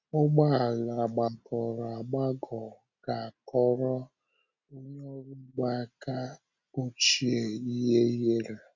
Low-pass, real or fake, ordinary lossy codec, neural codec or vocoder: 7.2 kHz; real; none; none